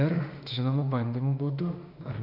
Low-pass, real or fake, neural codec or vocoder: 5.4 kHz; fake; autoencoder, 48 kHz, 32 numbers a frame, DAC-VAE, trained on Japanese speech